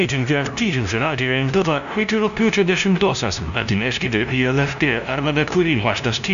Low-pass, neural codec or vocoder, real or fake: 7.2 kHz; codec, 16 kHz, 0.5 kbps, FunCodec, trained on LibriTTS, 25 frames a second; fake